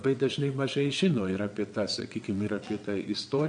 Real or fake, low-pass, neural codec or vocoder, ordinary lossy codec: fake; 9.9 kHz; vocoder, 22.05 kHz, 80 mel bands, WaveNeXt; MP3, 48 kbps